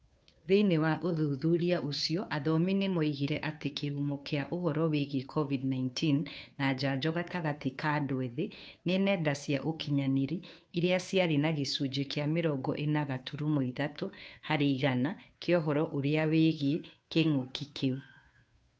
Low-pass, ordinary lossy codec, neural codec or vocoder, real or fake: none; none; codec, 16 kHz, 2 kbps, FunCodec, trained on Chinese and English, 25 frames a second; fake